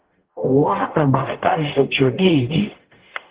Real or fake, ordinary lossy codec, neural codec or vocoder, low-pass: fake; Opus, 16 kbps; codec, 44.1 kHz, 0.9 kbps, DAC; 3.6 kHz